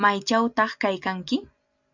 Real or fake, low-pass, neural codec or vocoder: real; 7.2 kHz; none